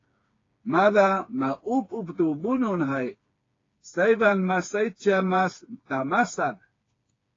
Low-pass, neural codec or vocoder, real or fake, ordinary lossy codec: 7.2 kHz; codec, 16 kHz, 4 kbps, FreqCodec, smaller model; fake; AAC, 32 kbps